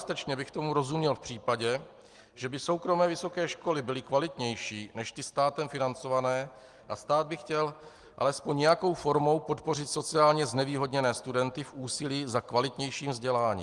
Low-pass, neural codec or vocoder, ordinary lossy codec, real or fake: 10.8 kHz; none; Opus, 24 kbps; real